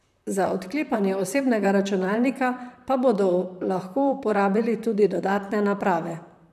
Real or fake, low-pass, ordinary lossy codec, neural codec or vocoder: fake; 14.4 kHz; none; vocoder, 44.1 kHz, 128 mel bands, Pupu-Vocoder